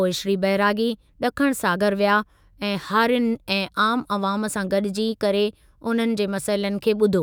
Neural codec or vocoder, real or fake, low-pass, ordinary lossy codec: autoencoder, 48 kHz, 128 numbers a frame, DAC-VAE, trained on Japanese speech; fake; none; none